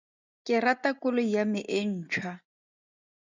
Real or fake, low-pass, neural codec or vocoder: real; 7.2 kHz; none